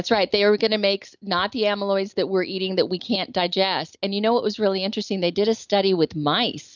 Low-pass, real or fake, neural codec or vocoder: 7.2 kHz; real; none